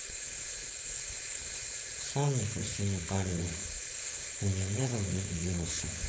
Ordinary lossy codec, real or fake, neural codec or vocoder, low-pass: none; fake; codec, 16 kHz, 4.8 kbps, FACodec; none